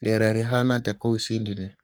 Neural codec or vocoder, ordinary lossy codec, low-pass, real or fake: codec, 44.1 kHz, 3.4 kbps, Pupu-Codec; none; none; fake